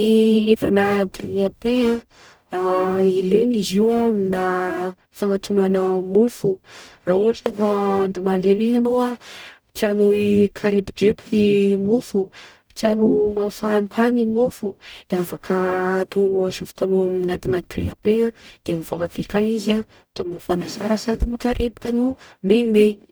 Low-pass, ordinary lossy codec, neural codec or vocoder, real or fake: none; none; codec, 44.1 kHz, 0.9 kbps, DAC; fake